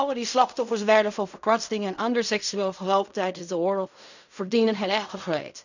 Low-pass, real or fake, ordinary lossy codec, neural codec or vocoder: 7.2 kHz; fake; none; codec, 16 kHz in and 24 kHz out, 0.4 kbps, LongCat-Audio-Codec, fine tuned four codebook decoder